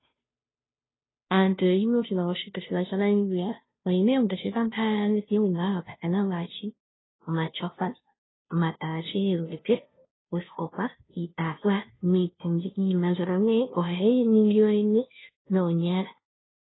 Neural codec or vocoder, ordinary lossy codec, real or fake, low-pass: codec, 16 kHz, 0.5 kbps, FunCodec, trained on Chinese and English, 25 frames a second; AAC, 16 kbps; fake; 7.2 kHz